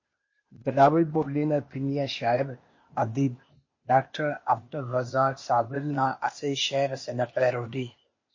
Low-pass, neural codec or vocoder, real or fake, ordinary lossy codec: 7.2 kHz; codec, 16 kHz, 0.8 kbps, ZipCodec; fake; MP3, 32 kbps